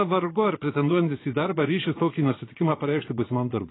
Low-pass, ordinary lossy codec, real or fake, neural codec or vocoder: 7.2 kHz; AAC, 16 kbps; fake; vocoder, 24 kHz, 100 mel bands, Vocos